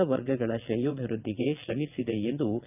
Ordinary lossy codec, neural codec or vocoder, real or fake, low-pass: none; vocoder, 22.05 kHz, 80 mel bands, WaveNeXt; fake; 3.6 kHz